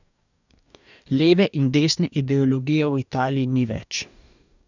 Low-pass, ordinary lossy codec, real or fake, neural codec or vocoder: 7.2 kHz; none; fake; codec, 44.1 kHz, 2.6 kbps, DAC